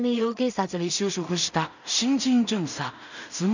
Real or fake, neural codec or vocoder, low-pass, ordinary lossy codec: fake; codec, 16 kHz in and 24 kHz out, 0.4 kbps, LongCat-Audio-Codec, two codebook decoder; 7.2 kHz; none